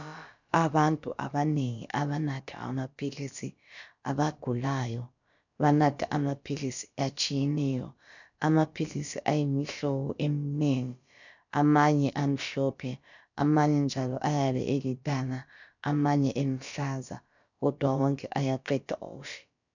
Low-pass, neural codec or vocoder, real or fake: 7.2 kHz; codec, 16 kHz, about 1 kbps, DyCAST, with the encoder's durations; fake